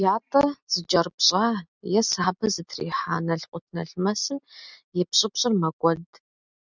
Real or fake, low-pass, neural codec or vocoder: real; 7.2 kHz; none